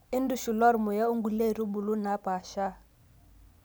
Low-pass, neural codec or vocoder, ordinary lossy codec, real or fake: none; none; none; real